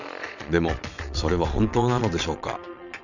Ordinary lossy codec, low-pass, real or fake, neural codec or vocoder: none; 7.2 kHz; fake; vocoder, 22.05 kHz, 80 mel bands, Vocos